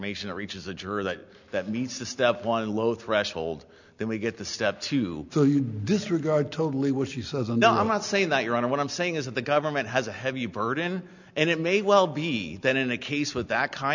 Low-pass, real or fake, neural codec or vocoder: 7.2 kHz; real; none